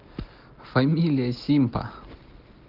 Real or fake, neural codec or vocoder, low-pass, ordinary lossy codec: real; none; 5.4 kHz; Opus, 16 kbps